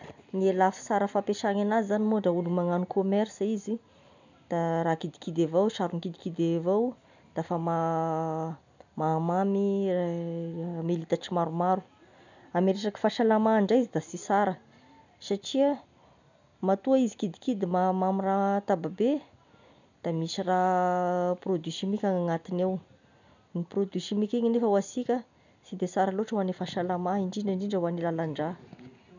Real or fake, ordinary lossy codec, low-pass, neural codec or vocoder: real; none; 7.2 kHz; none